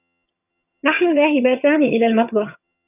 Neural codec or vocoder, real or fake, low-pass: vocoder, 22.05 kHz, 80 mel bands, HiFi-GAN; fake; 3.6 kHz